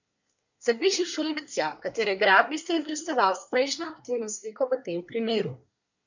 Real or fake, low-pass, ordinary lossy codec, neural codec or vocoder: fake; 7.2 kHz; none; codec, 24 kHz, 1 kbps, SNAC